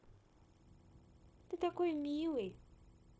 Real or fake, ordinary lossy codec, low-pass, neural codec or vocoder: fake; none; none; codec, 16 kHz, 0.9 kbps, LongCat-Audio-Codec